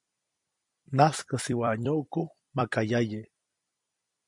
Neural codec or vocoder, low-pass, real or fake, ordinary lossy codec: vocoder, 44.1 kHz, 128 mel bands every 256 samples, BigVGAN v2; 10.8 kHz; fake; MP3, 64 kbps